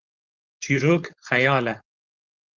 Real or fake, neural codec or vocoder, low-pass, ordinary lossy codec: fake; codec, 16 kHz, 4.8 kbps, FACodec; 7.2 kHz; Opus, 24 kbps